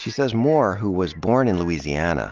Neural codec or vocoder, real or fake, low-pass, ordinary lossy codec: none; real; 7.2 kHz; Opus, 24 kbps